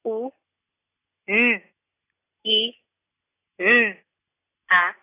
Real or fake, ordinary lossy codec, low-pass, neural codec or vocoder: real; AAC, 24 kbps; 3.6 kHz; none